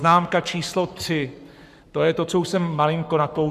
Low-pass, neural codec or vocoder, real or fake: 14.4 kHz; codec, 44.1 kHz, 7.8 kbps, Pupu-Codec; fake